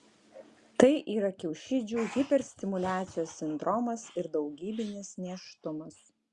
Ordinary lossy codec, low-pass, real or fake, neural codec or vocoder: Opus, 64 kbps; 10.8 kHz; real; none